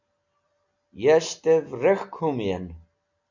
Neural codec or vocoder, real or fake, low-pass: none; real; 7.2 kHz